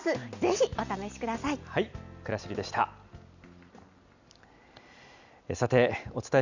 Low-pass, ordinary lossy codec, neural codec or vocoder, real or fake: 7.2 kHz; none; none; real